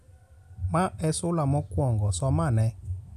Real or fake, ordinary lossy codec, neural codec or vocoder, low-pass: real; none; none; 14.4 kHz